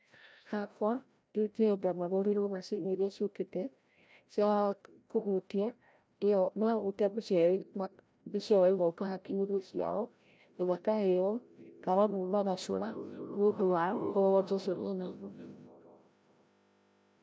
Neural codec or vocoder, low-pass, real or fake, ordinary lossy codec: codec, 16 kHz, 0.5 kbps, FreqCodec, larger model; none; fake; none